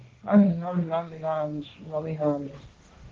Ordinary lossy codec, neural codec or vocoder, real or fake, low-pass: Opus, 16 kbps; codec, 16 kHz, 1.1 kbps, Voila-Tokenizer; fake; 7.2 kHz